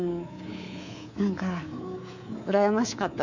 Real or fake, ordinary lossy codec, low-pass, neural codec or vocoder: fake; none; 7.2 kHz; codec, 24 kHz, 3.1 kbps, DualCodec